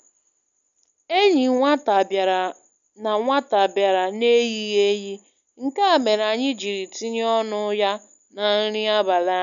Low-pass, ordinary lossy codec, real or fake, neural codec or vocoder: 7.2 kHz; none; real; none